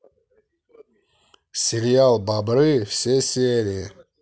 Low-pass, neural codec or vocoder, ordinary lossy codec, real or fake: none; none; none; real